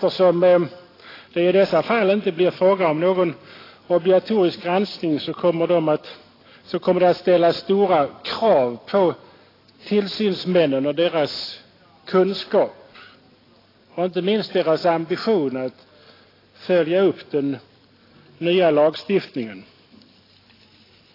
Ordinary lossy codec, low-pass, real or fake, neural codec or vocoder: AAC, 24 kbps; 5.4 kHz; real; none